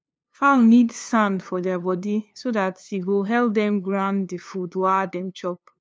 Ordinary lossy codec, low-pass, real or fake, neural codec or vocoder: none; none; fake; codec, 16 kHz, 2 kbps, FunCodec, trained on LibriTTS, 25 frames a second